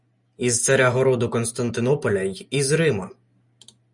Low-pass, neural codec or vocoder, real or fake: 10.8 kHz; none; real